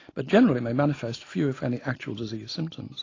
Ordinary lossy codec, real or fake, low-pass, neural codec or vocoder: AAC, 32 kbps; real; 7.2 kHz; none